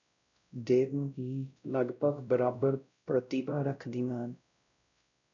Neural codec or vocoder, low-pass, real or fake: codec, 16 kHz, 0.5 kbps, X-Codec, WavLM features, trained on Multilingual LibriSpeech; 7.2 kHz; fake